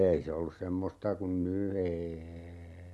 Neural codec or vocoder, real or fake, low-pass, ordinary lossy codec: none; real; none; none